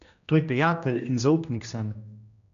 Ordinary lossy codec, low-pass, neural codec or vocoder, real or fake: none; 7.2 kHz; codec, 16 kHz, 1 kbps, X-Codec, HuBERT features, trained on general audio; fake